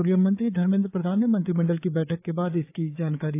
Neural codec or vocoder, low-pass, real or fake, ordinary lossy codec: codec, 16 kHz, 4 kbps, FreqCodec, larger model; 3.6 kHz; fake; AAC, 24 kbps